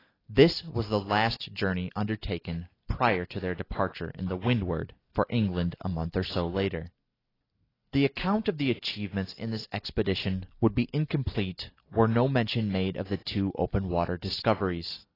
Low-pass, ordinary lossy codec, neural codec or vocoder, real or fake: 5.4 kHz; AAC, 24 kbps; none; real